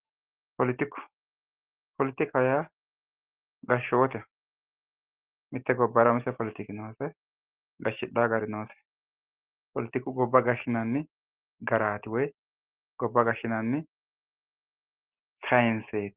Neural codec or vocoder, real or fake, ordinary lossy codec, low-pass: none; real; Opus, 16 kbps; 3.6 kHz